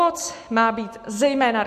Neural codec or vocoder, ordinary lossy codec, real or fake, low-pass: none; MP3, 64 kbps; real; 14.4 kHz